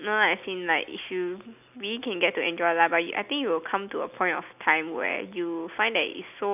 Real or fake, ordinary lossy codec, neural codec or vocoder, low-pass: real; none; none; 3.6 kHz